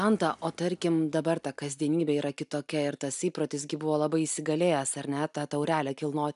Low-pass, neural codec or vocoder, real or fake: 10.8 kHz; none; real